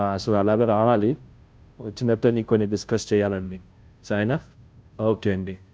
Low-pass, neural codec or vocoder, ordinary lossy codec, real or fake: none; codec, 16 kHz, 0.5 kbps, FunCodec, trained on Chinese and English, 25 frames a second; none; fake